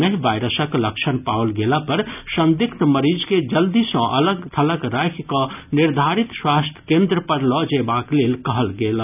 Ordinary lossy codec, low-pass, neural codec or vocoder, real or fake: none; 3.6 kHz; none; real